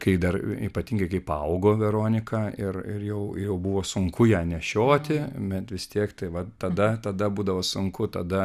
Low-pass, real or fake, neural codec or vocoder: 14.4 kHz; real; none